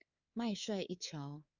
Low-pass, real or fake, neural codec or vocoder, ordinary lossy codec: 7.2 kHz; fake; codec, 24 kHz, 3.1 kbps, DualCodec; Opus, 64 kbps